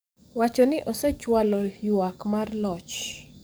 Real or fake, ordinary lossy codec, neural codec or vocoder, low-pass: fake; none; codec, 44.1 kHz, 7.8 kbps, DAC; none